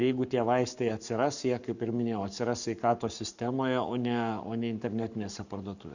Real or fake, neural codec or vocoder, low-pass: fake; codec, 44.1 kHz, 7.8 kbps, Pupu-Codec; 7.2 kHz